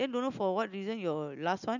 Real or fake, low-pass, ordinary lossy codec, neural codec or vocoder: real; 7.2 kHz; none; none